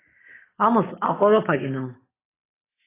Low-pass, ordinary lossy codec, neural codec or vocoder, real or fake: 3.6 kHz; AAC, 16 kbps; none; real